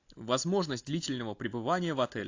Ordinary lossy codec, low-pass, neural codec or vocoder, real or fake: AAC, 48 kbps; 7.2 kHz; none; real